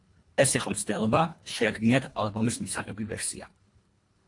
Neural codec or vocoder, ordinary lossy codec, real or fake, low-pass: codec, 24 kHz, 1.5 kbps, HILCodec; AAC, 48 kbps; fake; 10.8 kHz